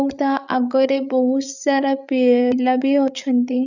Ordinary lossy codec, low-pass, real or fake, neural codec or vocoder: none; 7.2 kHz; fake; codec, 16 kHz, 8 kbps, FreqCodec, larger model